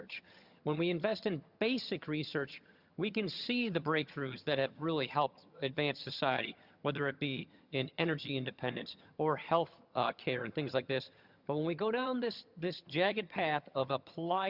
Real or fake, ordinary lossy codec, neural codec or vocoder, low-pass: fake; Opus, 64 kbps; vocoder, 22.05 kHz, 80 mel bands, HiFi-GAN; 5.4 kHz